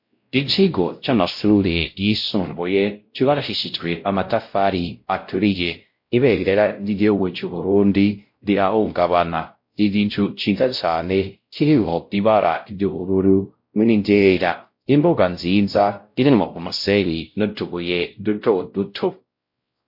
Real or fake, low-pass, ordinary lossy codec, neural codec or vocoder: fake; 5.4 kHz; MP3, 32 kbps; codec, 16 kHz, 0.5 kbps, X-Codec, WavLM features, trained on Multilingual LibriSpeech